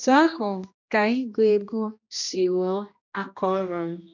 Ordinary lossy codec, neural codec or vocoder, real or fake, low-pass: none; codec, 16 kHz, 1 kbps, X-Codec, HuBERT features, trained on general audio; fake; 7.2 kHz